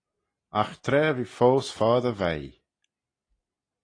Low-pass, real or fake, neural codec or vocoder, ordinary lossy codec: 9.9 kHz; real; none; AAC, 32 kbps